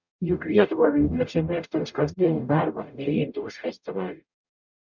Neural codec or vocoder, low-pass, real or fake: codec, 44.1 kHz, 0.9 kbps, DAC; 7.2 kHz; fake